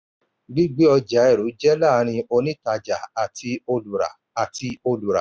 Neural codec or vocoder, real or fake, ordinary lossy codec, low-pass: none; real; none; none